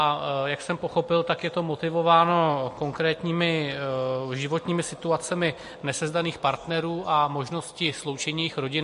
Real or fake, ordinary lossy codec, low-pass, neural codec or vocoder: real; MP3, 48 kbps; 10.8 kHz; none